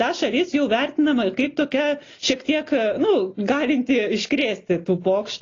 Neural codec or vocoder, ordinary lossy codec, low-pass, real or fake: none; AAC, 32 kbps; 7.2 kHz; real